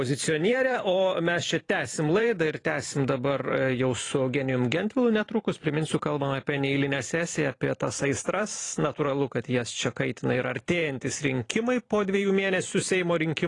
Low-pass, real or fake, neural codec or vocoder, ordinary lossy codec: 10.8 kHz; fake; vocoder, 44.1 kHz, 128 mel bands every 512 samples, BigVGAN v2; AAC, 32 kbps